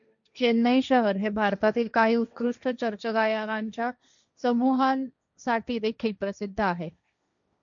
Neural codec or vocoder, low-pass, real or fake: codec, 16 kHz, 1.1 kbps, Voila-Tokenizer; 7.2 kHz; fake